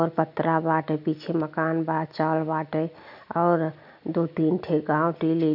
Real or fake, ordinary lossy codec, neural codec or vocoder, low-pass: real; none; none; 5.4 kHz